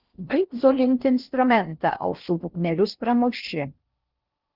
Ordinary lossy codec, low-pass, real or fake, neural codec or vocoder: Opus, 32 kbps; 5.4 kHz; fake; codec, 16 kHz in and 24 kHz out, 0.6 kbps, FocalCodec, streaming, 4096 codes